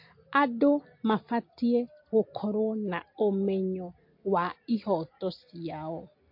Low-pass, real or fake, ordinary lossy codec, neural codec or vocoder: 5.4 kHz; real; MP3, 32 kbps; none